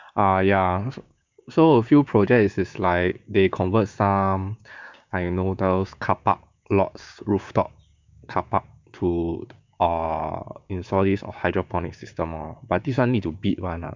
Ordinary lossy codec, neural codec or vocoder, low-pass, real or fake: MP3, 64 kbps; codec, 16 kHz, 6 kbps, DAC; 7.2 kHz; fake